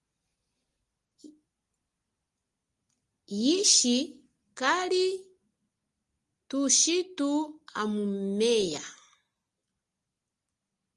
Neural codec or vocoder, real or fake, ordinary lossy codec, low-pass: none; real; Opus, 24 kbps; 10.8 kHz